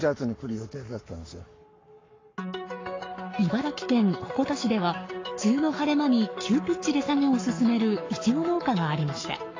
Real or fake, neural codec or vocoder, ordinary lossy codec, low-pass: fake; codec, 44.1 kHz, 7.8 kbps, Pupu-Codec; AAC, 32 kbps; 7.2 kHz